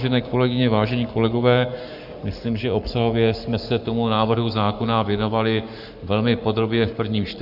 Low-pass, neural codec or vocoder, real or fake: 5.4 kHz; codec, 44.1 kHz, 7.8 kbps, Pupu-Codec; fake